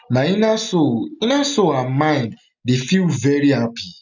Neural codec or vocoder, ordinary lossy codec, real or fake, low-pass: none; none; real; 7.2 kHz